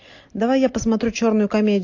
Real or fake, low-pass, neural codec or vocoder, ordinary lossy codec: real; 7.2 kHz; none; AAC, 48 kbps